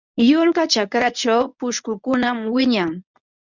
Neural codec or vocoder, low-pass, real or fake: codec, 24 kHz, 0.9 kbps, WavTokenizer, medium speech release version 1; 7.2 kHz; fake